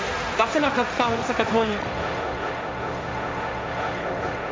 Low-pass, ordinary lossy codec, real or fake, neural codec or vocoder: none; none; fake; codec, 16 kHz, 1.1 kbps, Voila-Tokenizer